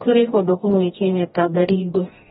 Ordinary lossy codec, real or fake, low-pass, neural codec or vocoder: AAC, 16 kbps; fake; 7.2 kHz; codec, 16 kHz, 1 kbps, FreqCodec, smaller model